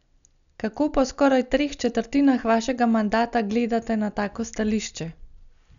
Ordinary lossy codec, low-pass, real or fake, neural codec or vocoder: none; 7.2 kHz; real; none